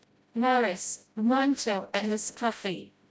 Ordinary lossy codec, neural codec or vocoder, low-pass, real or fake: none; codec, 16 kHz, 0.5 kbps, FreqCodec, smaller model; none; fake